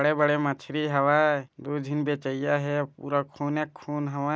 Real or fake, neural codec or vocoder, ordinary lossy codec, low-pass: real; none; none; none